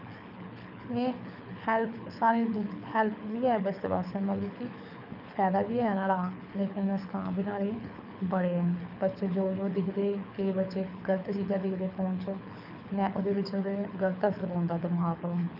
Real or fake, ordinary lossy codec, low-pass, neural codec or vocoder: fake; none; 5.4 kHz; codec, 24 kHz, 6 kbps, HILCodec